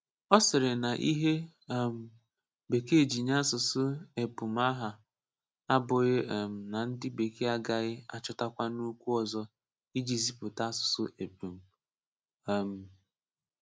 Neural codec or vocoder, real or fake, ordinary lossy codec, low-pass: none; real; none; none